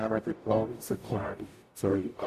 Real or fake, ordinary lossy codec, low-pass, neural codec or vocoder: fake; none; 14.4 kHz; codec, 44.1 kHz, 0.9 kbps, DAC